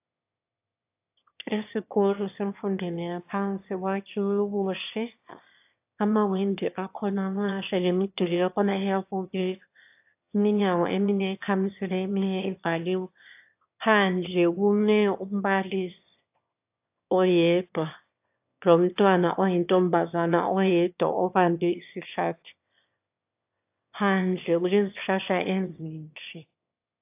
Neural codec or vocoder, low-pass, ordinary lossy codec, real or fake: autoencoder, 22.05 kHz, a latent of 192 numbers a frame, VITS, trained on one speaker; 3.6 kHz; AAC, 32 kbps; fake